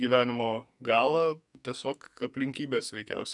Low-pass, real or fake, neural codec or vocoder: 10.8 kHz; fake; codec, 44.1 kHz, 2.6 kbps, SNAC